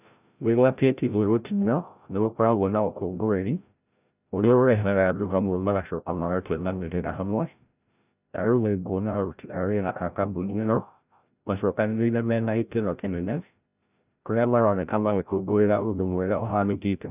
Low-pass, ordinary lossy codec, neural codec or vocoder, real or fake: 3.6 kHz; none; codec, 16 kHz, 0.5 kbps, FreqCodec, larger model; fake